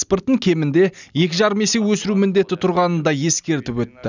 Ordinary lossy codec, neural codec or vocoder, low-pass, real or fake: none; none; 7.2 kHz; real